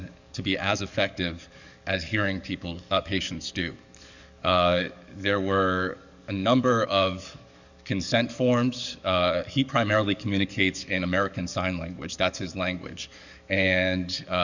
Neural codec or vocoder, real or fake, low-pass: codec, 44.1 kHz, 7.8 kbps, DAC; fake; 7.2 kHz